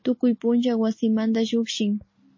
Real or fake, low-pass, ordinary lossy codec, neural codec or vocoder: real; 7.2 kHz; MP3, 32 kbps; none